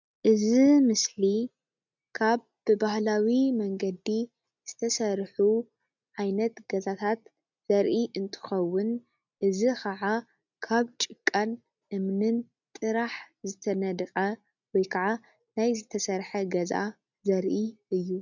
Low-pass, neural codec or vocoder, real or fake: 7.2 kHz; none; real